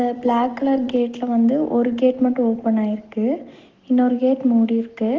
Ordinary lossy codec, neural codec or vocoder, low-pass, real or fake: Opus, 16 kbps; none; 7.2 kHz; real